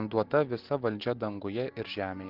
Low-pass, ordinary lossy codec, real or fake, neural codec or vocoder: 5.4 kHz; Opus, 16 kbps; real; none